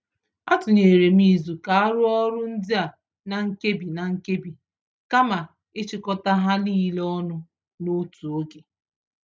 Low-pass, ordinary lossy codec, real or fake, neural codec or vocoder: none; none; real; none